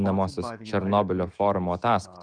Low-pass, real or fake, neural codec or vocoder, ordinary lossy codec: 9.9 kHz; fake; autoencoder, 48 kHz, 128 numbers a frame, DAC-VAE, trained on Japanese speech; Opus, 32 kbps